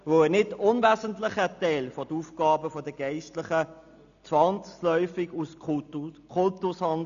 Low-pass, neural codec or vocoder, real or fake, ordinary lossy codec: 7.2 kHz; none; real; none